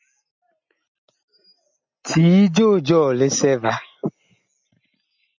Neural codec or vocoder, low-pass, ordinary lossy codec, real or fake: none; 7.2 kHz; MP3, 64 kbps; real